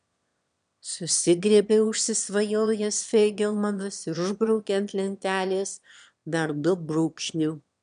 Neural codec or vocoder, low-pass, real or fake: autoencoder, 22.05 kHz, a latent of 192 numbers a frame, VITS, trained on one speaker; 9.9 kHz; fake